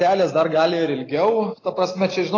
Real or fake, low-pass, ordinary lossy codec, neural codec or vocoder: real; 7.2 kHz; AAC, 32 kbps; none